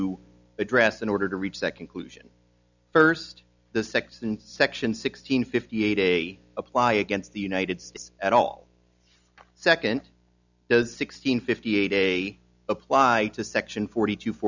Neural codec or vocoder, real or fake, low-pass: none; real; 7.2 kHz